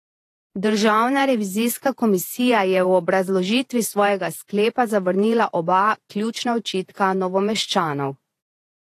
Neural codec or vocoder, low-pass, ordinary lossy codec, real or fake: vocoder, 48 kHz, 128 mel bands, Vocos; 14.4 kHz; AAC, 48 kbps; fake